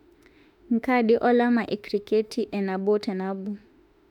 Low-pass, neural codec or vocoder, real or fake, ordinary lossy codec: 19.8 kHz; autoencoder, 48 kHz, 32 numbers a frame, DAC-VAE, trained on Japanese speech; fake; none